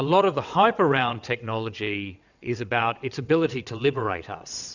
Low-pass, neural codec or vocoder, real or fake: 7.2 kHz; vocoder, 22.05 kHz, 80 mel bands, WaveNeXt; fake